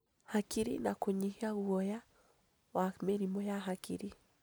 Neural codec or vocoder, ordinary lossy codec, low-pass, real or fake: none; none; none; real